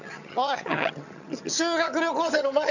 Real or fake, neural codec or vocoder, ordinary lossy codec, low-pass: fake; vocoder, 22.05 kHz, 80 mel bands, HiFi-GAN; none; 7.2 kHz